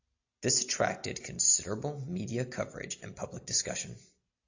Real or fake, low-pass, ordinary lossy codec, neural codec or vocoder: real; 7.2 kHz; AAC, 48 kbps; none